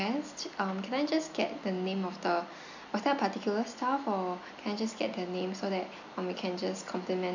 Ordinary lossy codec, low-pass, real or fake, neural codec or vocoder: none; 7.2 kHz; real; none